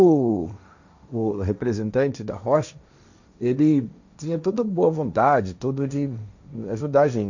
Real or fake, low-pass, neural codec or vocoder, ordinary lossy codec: fake; 7.2 kHz; codec, 16 kHz, 1.1 kbps, Voila-Tokenizer; none